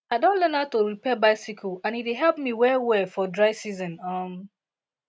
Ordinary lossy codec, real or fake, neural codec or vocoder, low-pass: none; real; none; none